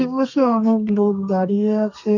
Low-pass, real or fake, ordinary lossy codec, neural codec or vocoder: 7.2 kHz; fake; none; codec, 32 kHz, 1.9 kbps, SNAC